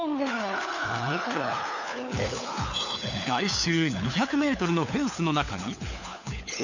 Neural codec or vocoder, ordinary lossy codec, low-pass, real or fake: codec, 16 kHz, 4 kbps, FunCodec, trained on LibriTTS, 50 frames a second; none; 7.2 kHz; fake